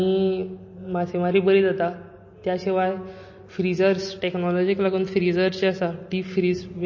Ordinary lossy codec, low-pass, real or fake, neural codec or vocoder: MP3, 32 kbps; 7.2 kHz; real; none